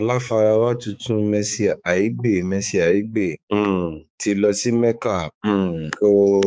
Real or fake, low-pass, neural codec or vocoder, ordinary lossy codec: fake; none; codec, 16 kHz, 4 kbps, X-Codec, HuBERT features, trained on balanced general audio; none